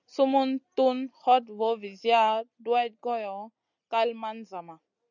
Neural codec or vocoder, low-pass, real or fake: none; 7.2 kHz; real